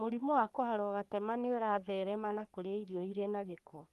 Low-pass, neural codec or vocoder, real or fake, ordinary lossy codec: 14.4 kHz; codec, 44.1 kHz, 3.4 kbps, Pupu-Codec; fake; Opus, 32 kbps